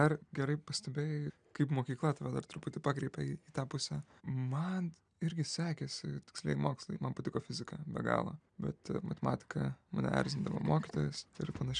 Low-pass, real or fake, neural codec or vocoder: 9.9 kHz; real; none